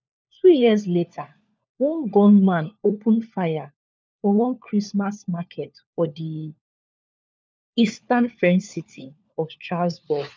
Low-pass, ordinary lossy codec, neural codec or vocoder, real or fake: none; none; codec, 16 kHz, 4 kbps, FunCodec, trained on LibriTTS, 50 frames a second; fake